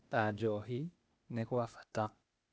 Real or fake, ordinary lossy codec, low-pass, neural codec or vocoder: fake; none; none; codec, 16 kHz, 0.8 kbps, ZipCodec